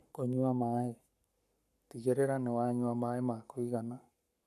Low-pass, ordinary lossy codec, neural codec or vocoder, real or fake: 14.4 kHz; none; codec, 44.1 kHz, 7.8 kbps, Pupu-Codec; fake